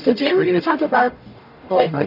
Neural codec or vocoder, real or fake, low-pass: codec, 44.1 kHz, 0.9 kbps, DAC; fake; 5.4 kHz